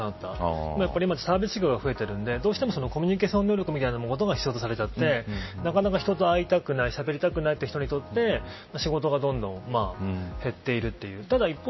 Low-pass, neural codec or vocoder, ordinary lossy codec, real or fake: 7.2 kHz; none; MP3, 24 kbps; real